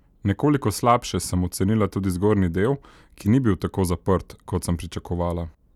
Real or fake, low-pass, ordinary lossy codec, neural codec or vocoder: real; 19.8 kHz; none; none